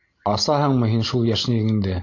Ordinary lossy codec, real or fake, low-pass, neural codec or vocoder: AAC, 48 kbps; real; 7.2 kHz; none